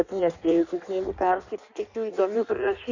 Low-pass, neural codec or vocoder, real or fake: 7.2 kHz; codec, 16 kHz in and 24 kHz out, 1.1 kbps, FireRedTTS-2 codec; fake